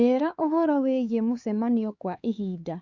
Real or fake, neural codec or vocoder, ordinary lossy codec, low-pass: fake; codec, 16 kHz, 4 kbps, X-Codec, HuBERT features, trained on LibriSpeech; Opus, 64 kbps; 7.2 kHz